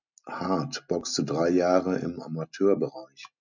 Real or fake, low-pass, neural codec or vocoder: real; 7.2 kHz; none